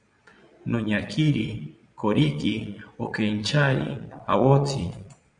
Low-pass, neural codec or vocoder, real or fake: 9.9 kHz; vocoder, 22.05 kHz, 80 mel bands, Vocos; fake